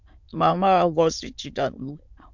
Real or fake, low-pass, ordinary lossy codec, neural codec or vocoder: fake; 7.2 kHz; MP3, 64 kbps; autoencoder, 22.05 kHz, a latent of 192 numbers a frame, VITS, trained on many speakers